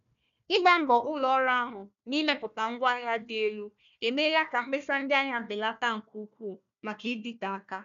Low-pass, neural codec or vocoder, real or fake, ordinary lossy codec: 7.2 kHz; codec, 16 kHz, 1 kbps, FunCodec, trained on Chinese and English, 50 frames a second; fake; none